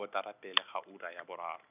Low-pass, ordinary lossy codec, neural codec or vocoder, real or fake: 3.6 kHz; none; none; real